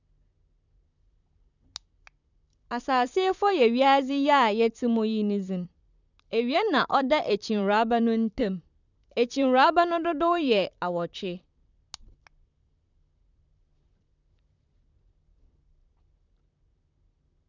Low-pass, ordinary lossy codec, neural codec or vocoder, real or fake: 7.2 kHz; none; vocoder, 44.1 kHz, 128 mel bands, Pupu-Vocoder; fake